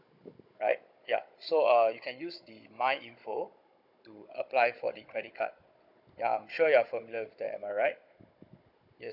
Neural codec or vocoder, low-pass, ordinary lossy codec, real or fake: codec, 16 kHz, 16 kbps, FunCodec, trained on Chinese and English, 50 frames a second; 5.4 kHz; none; fake